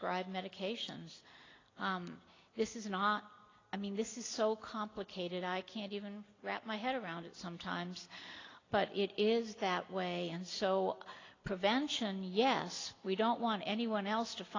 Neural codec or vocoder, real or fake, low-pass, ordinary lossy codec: none; real; 7.2 kHz; AAC, 32 kbps